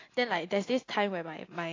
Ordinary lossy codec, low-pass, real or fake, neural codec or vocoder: AAC, 32 kbps; 7.2 kHz; real; none